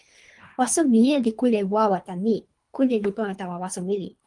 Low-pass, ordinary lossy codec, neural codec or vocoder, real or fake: 10.8 kHz; Opus, 32 kbps; codec, 24 kHz, 3 kbps, HILCodec; fake